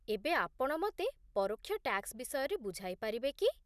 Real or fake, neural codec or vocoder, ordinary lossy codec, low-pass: real; none; none; 14.4 kHz